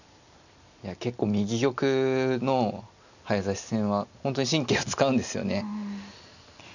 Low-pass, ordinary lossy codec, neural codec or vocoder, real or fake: 7.2 kHz; none; none; real